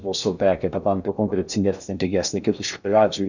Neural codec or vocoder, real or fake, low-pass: codec, 16 kHz in and 24 kHz out, 0.6 kbps, FocalCodec, streaming, 2048 codes; fake; 7.2 kHz